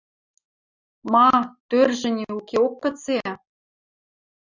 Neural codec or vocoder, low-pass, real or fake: none; 7.2 kHz; real